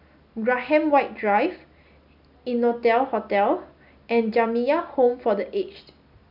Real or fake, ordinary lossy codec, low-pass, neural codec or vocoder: real; none; 5.4 kHz; none